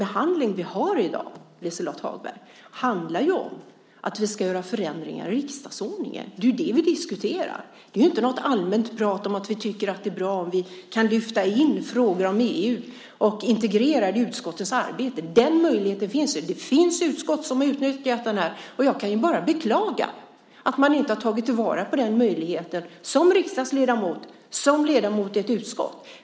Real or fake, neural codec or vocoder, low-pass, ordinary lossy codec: real; none; none; none